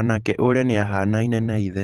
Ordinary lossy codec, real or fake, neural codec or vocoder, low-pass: Opus, 16 kbps; fake; vocoder, 44.1 kHz, 128 mel bands every 512 samples, BigVGAN v2; 19.8 kHz